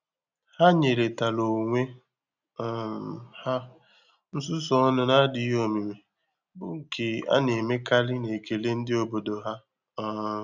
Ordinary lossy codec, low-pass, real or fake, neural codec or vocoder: none; 7.2 kHz; real; none